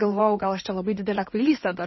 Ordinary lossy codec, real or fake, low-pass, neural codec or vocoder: MP3, 24 kbps; fake; 7.2 kHz; codec, 16 kHz in and 24 kHz out, 2.2 kbps, FireRedTTS-2 codec